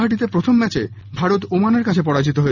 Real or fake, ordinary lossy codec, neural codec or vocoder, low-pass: real; none; none; 7.2 kHz